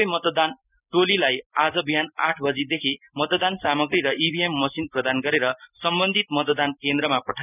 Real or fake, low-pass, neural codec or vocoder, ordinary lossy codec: real; 3.6 kHz; none; none